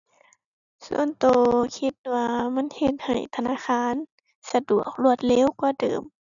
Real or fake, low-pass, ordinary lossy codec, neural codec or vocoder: real; 7.2 kHz; none; none